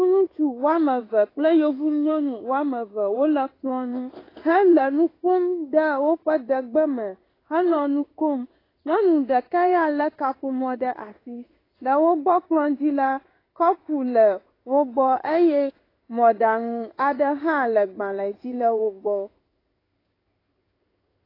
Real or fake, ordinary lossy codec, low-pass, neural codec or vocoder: fake; AAC, 24 kbps; 5.4 kHz; codec, 16 kHz in and 24 kHz out, 1 kbps, XY-Tokenizer